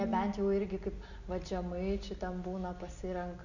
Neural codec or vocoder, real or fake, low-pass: none; real; 7.2 kHz